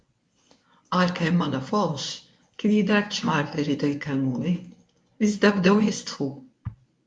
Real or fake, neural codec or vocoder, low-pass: fake; codec, 24 kHz, 0.9 kbps, WavTokenizer, medium speech release version 1; 9.9 kHz